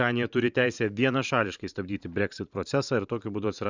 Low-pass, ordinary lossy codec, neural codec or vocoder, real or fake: 7.2 kHz; Opus, 64 kbps; vocoder, 44.1 kHz, 128 mel bands every 512 samples, BigVGAN v2; fake